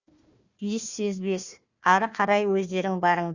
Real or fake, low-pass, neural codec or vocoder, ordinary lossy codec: fake; 7.2 kHz; codec, 16 kHz, 1 kbps, FunCodec, trained on Chinese and English, 50 frames a second; Opus, 64 kbps